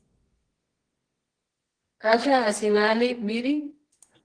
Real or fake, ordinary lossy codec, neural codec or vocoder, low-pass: fake; Opus, 16 kbps; codec, 24 kHz, 0.9 kbps, WavTokenizer, medium music audio release; 9.9 kHz